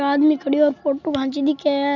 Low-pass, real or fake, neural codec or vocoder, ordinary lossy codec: 7.2 kHz; real; none; none